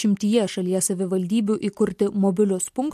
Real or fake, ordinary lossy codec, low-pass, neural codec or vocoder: real; MP3, 64 kbps; 14.4 kHz; none